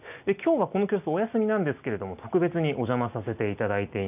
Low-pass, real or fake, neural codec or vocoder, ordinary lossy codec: 3.6 kHz; real; none; none